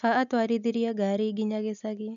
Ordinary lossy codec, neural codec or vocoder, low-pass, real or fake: none; none; 7.2 kHz; real